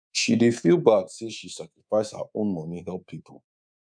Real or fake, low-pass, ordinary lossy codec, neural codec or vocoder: fake; 9.9 kHz; none; codec, 24 kHz, 3.1 kbps, DualCodec